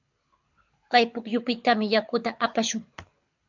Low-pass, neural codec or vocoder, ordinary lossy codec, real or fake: 7.2 kHz; codec, 24 kHz, 6 kbps, HILCodec; MP3, 64 kbps; fake